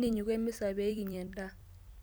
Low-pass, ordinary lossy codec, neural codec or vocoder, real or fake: none; none; vocoder, 44.1 kHz, 128 mel bands every 256 samples, BigVGAN v2; fake